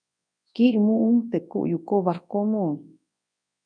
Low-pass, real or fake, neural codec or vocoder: 9.9 kHz; fake; codec, 24 kHz, 0.9 kbps, WavTokenizer, large speech release